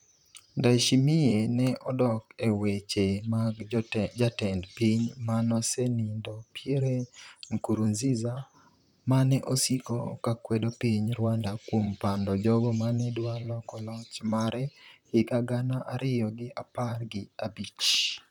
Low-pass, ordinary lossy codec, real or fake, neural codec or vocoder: 19.8 kHz; none; fake; vocoder, 44.1 kHz, 128 mel bands, Pupu-Vocoder